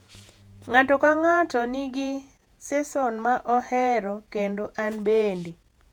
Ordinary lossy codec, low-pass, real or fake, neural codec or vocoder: none; 19.8 kHz; fake; vocoder, 48 kHz, 128 mel bands, Vocos